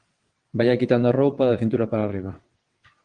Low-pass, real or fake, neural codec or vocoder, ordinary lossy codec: 9.9 kHz; fake; vocoder, 22.05 kHz, 80 mel bands, WaveNeXt; Opus, 24 kbps